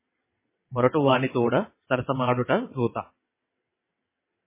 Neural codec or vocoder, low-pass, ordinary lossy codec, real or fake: vocoder, 22.05 kHz, 80 mel bands, WaveNeXt; 3.6 kHz; MP3, 16 kbps; fake